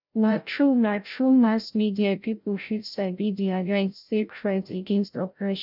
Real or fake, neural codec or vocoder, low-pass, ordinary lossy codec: fake; codec, 16 kHz, 0.5 kbps, FreqCodec, larger model; 5.4 kHz; none